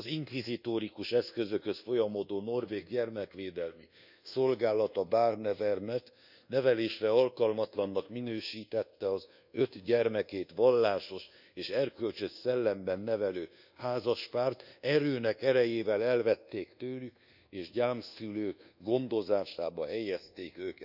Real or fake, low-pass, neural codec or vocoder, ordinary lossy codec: fake; 5.4 kHz; codec, 24 kHz, 1.2 kbps, DualCodec; none